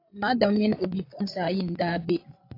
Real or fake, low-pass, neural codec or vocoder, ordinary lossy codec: fake; 5.4 kHz; codec, 16 kHz, 8 kbps, FreqCodec, larger model; AAC, 32 kbps